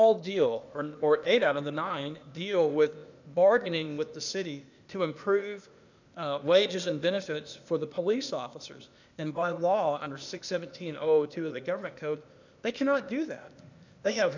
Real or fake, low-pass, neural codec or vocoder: fake; 7.2 kHz; codec, 16 kHz, 0.8 kbps, ZipCodec